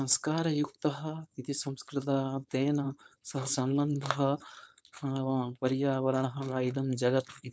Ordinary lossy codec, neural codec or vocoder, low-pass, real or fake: none; codec, 16 kHz, 4.8 kbps, FACodec; none; fake